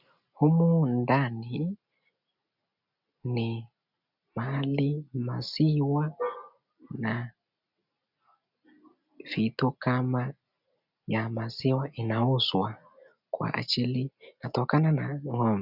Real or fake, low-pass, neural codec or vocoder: real; 5.4 kHz; none